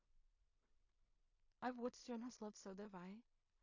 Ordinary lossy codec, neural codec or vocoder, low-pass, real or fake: AAC, 48 kbps; codec, 16 kHz in and 24 kHz out, 0.4 kbps, LongCat-Audio-Codec, two codebook decoder; 7.2 kHz; fake